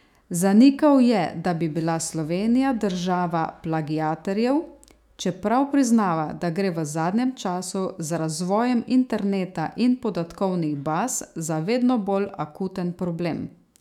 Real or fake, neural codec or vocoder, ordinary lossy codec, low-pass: fake; autoencoder, 48 kHz, 128 numbers a frame, DAC-VAE, trained on Japanese speech; none; 19.8 kHz